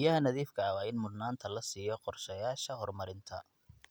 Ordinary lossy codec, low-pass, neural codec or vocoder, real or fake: none; none; none; real